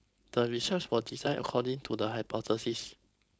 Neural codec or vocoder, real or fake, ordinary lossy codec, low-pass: codec, 16 kHz, 4.8 kbps, FACodec; fake; none; none